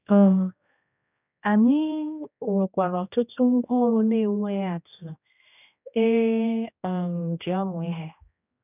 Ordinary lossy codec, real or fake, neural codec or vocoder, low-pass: none; fake; codec, 16 kHz, 1 kbps, X-Codec, HuBERT features, trained on general audio; 3.6 kHz